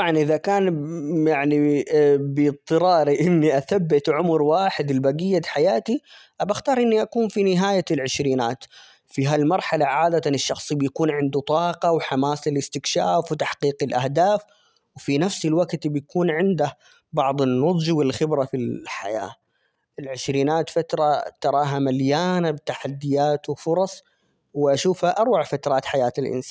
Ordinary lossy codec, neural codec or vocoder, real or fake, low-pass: none; none; real; none